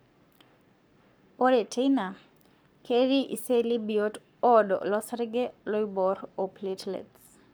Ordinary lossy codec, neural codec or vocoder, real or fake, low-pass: none; codec, 44.1 kHz, 7.8 kbps, Pupu-Codec; fake; none